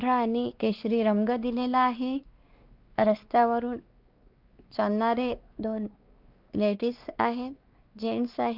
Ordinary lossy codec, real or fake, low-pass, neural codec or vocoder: Opus, 24 kbps; fake; 5.4 kHz; codec, 16 kHz, 2 kbps, X-Codec, WavLM features, trained on Multilingual LibriSpeech